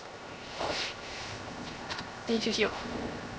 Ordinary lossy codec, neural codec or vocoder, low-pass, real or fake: none; codec, 16 kHz, 0.7 kbps, FocalCodec; none; fake